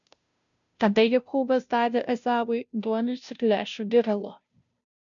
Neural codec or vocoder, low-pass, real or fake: codec, 16 kHz, 0.5 kbps, FunCodec, trained on Chinese and English, 25 frames a second; 7.2 kHz; fake